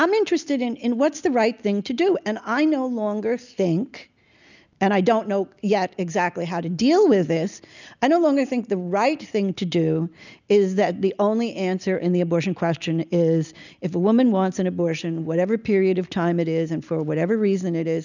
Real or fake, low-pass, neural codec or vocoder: real; 7.2 kHz; none